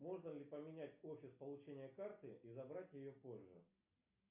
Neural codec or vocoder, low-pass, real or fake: none; 3.6 kHz; real